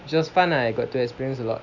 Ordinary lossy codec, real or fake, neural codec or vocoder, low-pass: none; real; none; 7.2 kHz